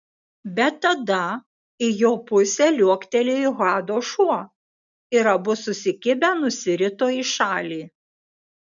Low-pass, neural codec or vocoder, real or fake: 7.2 kHz; none; real